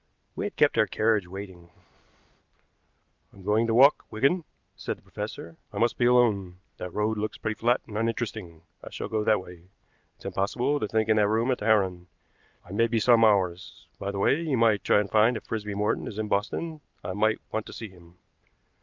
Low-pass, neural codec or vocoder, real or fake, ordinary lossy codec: 7.2 kHz; none; real; Opus, 24 kbps